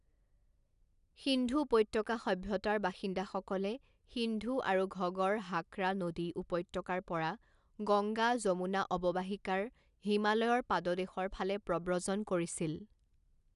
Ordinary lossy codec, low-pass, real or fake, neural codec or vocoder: none; 10.8 kHz; real; none